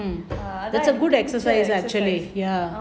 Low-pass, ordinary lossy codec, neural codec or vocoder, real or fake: none; none; none; real